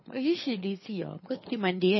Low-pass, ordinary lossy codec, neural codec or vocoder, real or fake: 7.2 kHz; MP3, 24 kbps; codec, 24 kHz, 6 kbps, HILCodec; fake